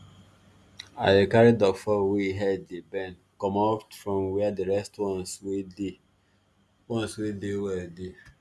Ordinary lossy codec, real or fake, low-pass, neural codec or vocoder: none; real; none; none